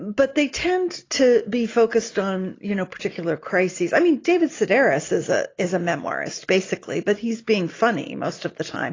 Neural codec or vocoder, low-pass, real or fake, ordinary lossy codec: none; 7.2 kHz; real; AAC, 32 kbps